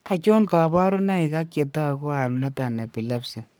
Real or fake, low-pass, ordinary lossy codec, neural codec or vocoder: fake; none; none; codec, 44.1 kHz, 3.4 kbps, Pupu-Codec